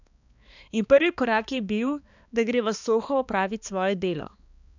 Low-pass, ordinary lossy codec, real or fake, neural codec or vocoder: 7.2 kHz; none; fake; codec, 16 kHz, 2 kbps, X-Codec, HuBERT features, trained on balanced general audio